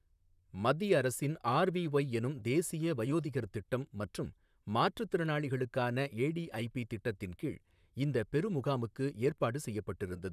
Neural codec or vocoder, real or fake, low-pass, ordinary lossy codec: vocoder, 44.1 kHz, 128 mel bands, Pupu-Vocoder; fake; 14.4 kHz; none